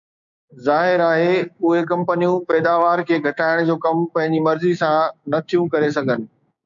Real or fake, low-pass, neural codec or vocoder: fake; 7.2 kHz; codec, 16 kHz, 6 kbps, DAC